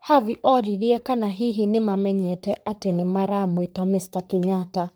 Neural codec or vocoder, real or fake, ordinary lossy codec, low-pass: codec, 44.1 kHz, 3.4 kbps, Pupu-Codec; fake; none; none